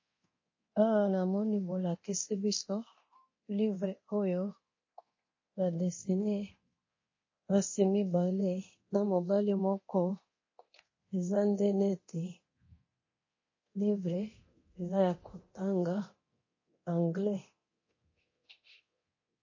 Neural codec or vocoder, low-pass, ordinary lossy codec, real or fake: codec, 24 kHz, 0.9 kbps, DualCodec; 7.2 kHz; MP3, 32 kbps; fake